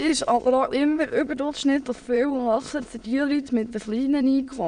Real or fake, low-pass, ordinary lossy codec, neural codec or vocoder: fake; 9.9 kHz; none; autoencoder, 22.05 kHz, a latent of 192 numbers a frame, VITS, trained on many speakers